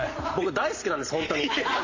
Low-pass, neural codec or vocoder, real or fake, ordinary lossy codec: 7.2 kHz; none; real; MP3, 32 kbps